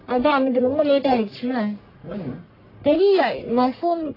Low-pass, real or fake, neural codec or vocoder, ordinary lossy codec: 5.4 kHz; fake; codec, 44.1 kHz, 1.7 kbps, Pupu-Codec; AAC, 24 kbps